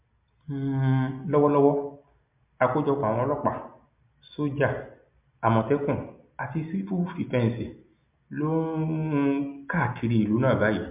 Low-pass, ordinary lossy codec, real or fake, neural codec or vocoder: 3.6 kHz; MP3, 32 kbps; real; none